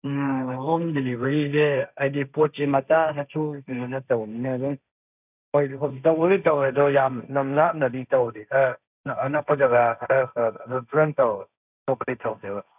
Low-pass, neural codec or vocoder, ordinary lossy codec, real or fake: 3.6 kHz; codec, 16 kHz, 1.1 kbps, Voila-Tokenizer; none; fake